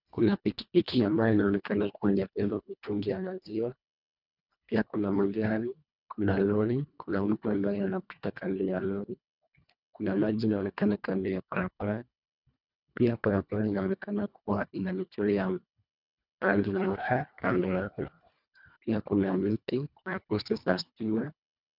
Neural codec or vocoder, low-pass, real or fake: codec, 24 kHz, 1.5 kbps, HILCodec; 5.4 kHz; fake